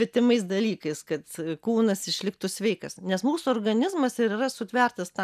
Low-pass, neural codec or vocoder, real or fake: 14.4 kHz; none; real